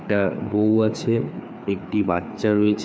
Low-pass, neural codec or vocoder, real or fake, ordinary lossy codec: none; codec, 16 kHz, 4 kbps, FreqCodec, larger model; fake; none